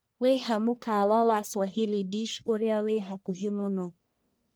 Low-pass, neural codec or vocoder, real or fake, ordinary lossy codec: none; codec, 44.1 kHz, 1.7 kbps, Pupu-Codec; fake; none